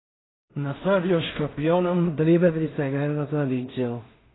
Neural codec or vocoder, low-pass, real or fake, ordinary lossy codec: codec, 16 kHz in and 24 kHz out, 0.4 kbps, LongCat-Audio-Codec, two codebook decoder; 7.2 kHz; fake; AAC, 16 kbps